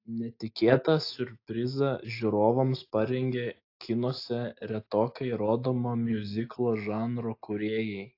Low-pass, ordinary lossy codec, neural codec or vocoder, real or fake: 5.4 kHz; AAC, 32 kbps; none; real